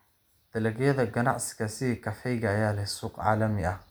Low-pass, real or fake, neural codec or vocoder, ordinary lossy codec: none; real; none; none